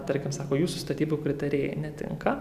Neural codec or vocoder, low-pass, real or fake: none; 14.4 kHz; real